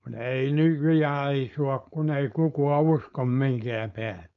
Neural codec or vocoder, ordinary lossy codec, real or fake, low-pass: codec, 16 kHz, 4.8 kbps, FACodec; none; fake; 7.2 kHz